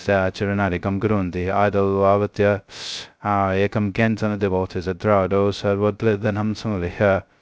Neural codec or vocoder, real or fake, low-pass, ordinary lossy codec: codec, 16 kHz, 0.2 kbps, FocalCodec; fake; none; none